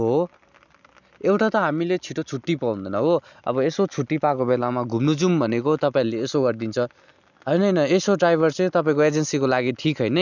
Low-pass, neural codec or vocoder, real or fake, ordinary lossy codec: 7.2 kHz; none; real; none